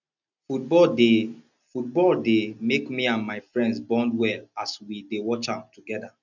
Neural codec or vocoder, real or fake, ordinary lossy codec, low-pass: none; real; none; 7.2 kHz